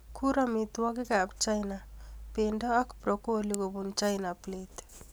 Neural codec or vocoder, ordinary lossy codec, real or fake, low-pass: none; none; real; none